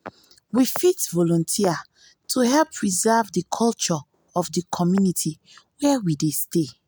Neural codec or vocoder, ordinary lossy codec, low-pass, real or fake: none; none; none; real